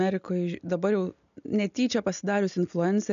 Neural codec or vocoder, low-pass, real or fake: none; 7.2 kHz; real